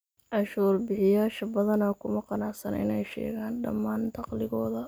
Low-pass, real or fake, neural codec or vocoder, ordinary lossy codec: none; real; none; none